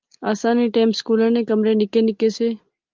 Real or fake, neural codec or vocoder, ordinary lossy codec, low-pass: real; none; Opus, 32 kbps; 7.2 kHz